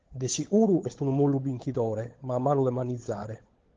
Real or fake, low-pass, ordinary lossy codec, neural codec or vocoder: fake; 7.2 kHz; Opus, 16 kbps; codec, 16 kHz, 16 kbps, FunCodec, trained on LibriTTS, 50 frames a second